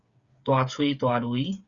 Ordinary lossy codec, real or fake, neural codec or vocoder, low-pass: Opus, 64 kbps; fake; codec, 16 kHz, 8 kbps, FreqCodec, smaller model; 7.2 kHz